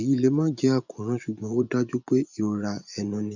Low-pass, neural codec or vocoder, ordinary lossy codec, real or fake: 7.2 kHz; vocoder, 24 kHz, 100 mel bands, Vocos; none; fake